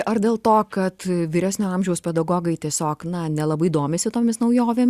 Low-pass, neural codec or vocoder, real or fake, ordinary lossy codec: 14.4 kHz; none; real; Opus, 64 kbps